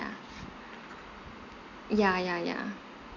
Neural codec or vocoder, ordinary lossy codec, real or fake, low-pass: none; none; real; 7.2 kHz